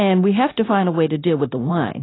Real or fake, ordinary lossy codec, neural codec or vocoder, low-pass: fake; AAC, 16 kbps; codec, 16 kHz, 0.9 kbps, LongCat-Audio-Codec; 7.2 kHz